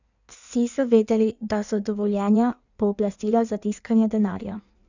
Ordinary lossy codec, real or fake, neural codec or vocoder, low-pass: none; fake; codec, 16 kHz in and 24 kHz out, 1.1 kbps, FireRedTTS-2 codec; 7.2 kHz